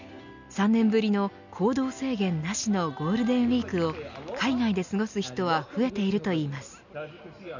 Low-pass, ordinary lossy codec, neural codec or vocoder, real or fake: 7.2 kHz; none; none; real